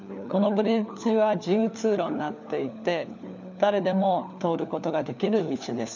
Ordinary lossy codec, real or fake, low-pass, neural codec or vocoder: none; fake; 7.2 kHz; codec, 16 kHz, 4 kbps, FunCodec, trained on LibriTTS, 50 frames a second